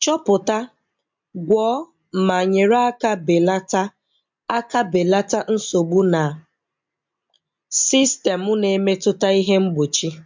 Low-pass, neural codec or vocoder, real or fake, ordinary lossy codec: 7.2 kHz; none; real; MP3, 64 kbps